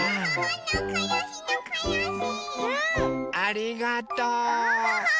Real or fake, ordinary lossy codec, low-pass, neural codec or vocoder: real; none; none; none